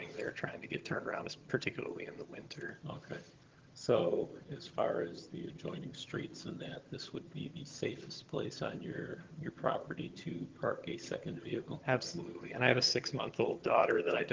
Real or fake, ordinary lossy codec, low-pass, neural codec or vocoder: fake; Opus, 16 kbps; 7.2 kHz; vocoder, 22.05 kHz, 80 mel bands, HiFi-GAN